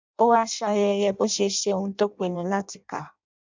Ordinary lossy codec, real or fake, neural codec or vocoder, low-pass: MP3, 64 kbps; fake; codec, 16 kHz in and 24 kHz out, 0.6 kbps, FireRedTTS-2 codec; 7.2 kHz